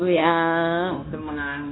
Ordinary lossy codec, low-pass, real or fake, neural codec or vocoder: AAC, 16 kbps; 7.2 kHz; fake; codec, 16 kHz, 0.9 kbps, LongCat-Audio-Codec